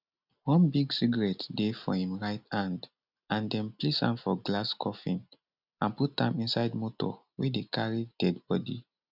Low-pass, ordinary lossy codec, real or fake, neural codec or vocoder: 5.4 kHz; none; real; none